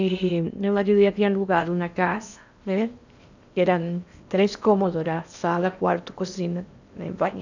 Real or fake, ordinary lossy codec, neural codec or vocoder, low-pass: fake; none; codec, 16 kHz in and 24 kHz out, 0.8 kbps, FocalCodec, streaming, 65536 codes; 7.2 kHz